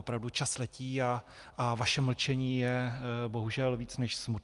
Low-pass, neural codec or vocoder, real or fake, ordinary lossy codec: 10.8 kHz; none; real; Opus, 32 kbps